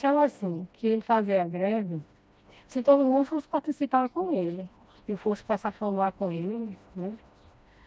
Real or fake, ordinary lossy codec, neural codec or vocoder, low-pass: fake; none; codec, 16 kHz, 1 kbps, FreqCodec, smaller model; none